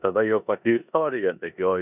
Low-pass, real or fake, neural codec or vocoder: 3.6 kHz; fake; codec, 16 kHz in and 24 kHz out, 0.9 kbps, LongCat-Audio-Codec, four codebook decoder